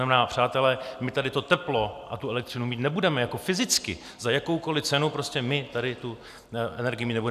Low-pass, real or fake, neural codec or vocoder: 14.4 kHz; real; none